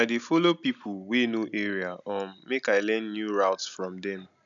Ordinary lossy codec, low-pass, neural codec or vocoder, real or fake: none; 7.2 kHz; none; real